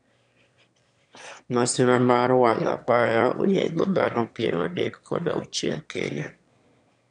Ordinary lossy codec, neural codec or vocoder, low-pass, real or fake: none; autoencoder, 22.05 kHz, a latent of 192 numbers a frame, VITS, trained on one speaker; 9.9 kHz; fake